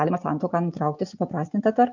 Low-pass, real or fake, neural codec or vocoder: 7.2 kHz; real; none